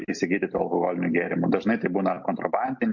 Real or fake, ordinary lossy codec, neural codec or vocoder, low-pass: real; MP3, 48 kbps; none; 7.2 kHz